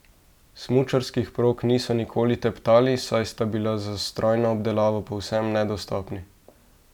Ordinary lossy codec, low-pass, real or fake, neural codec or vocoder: none; 19.8 kHz; real; none